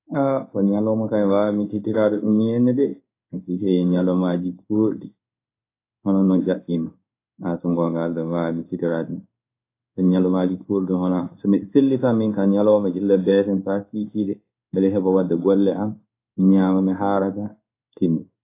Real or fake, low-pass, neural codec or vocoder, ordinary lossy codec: fake; 3.6 kHz; codec, 16 kHz in and 24 kHz out, 1 kbps, XY-Tokenizer; AAC, 24 kbps